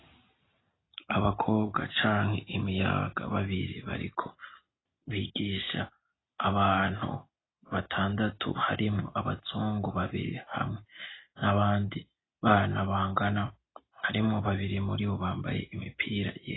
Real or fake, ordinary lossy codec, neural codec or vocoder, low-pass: real; AAC, 16 kbps; none; 7.2 kHz